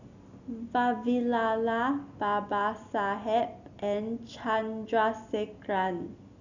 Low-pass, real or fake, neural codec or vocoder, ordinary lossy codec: 7.2 kHz; real; none; none